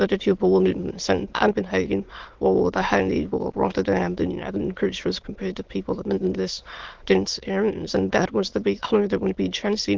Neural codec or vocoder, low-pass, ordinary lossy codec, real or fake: autoencoder, 22.05 kHz, a latent of 192 numbers a frame, VITS, trained on many speakers; 7.2 kHz; Opus, 16 kbps; fake